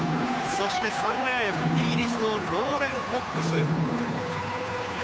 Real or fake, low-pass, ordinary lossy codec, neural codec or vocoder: fake; none; none; codec, 16 kHz, 2 kbps, FunCodec, trained on Chinese and English, 25 frames a second